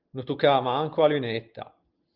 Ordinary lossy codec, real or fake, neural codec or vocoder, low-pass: Opus, 32 kbps; real; none; 5.4 kHz